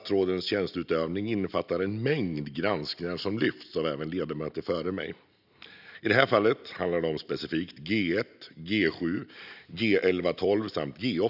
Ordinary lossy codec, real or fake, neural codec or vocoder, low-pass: none; real; none; 5.4 kHz